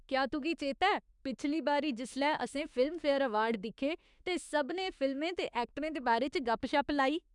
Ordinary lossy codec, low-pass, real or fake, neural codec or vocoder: none; 10.8 kHz; fake; autoencoder, 48 kHz, 32 numbers a frame, DAC-VAE, trained on Japanese speech